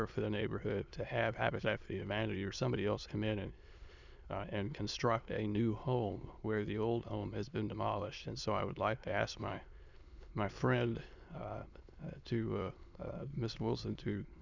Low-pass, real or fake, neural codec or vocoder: 7.2 kHz; fake; autoencoder, 22.05 kHz, a latent of 192 numbers a frame, VITS, trained on many speakers